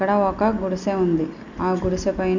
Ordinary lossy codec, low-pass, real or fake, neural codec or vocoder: none; 7.2 kHz; real; none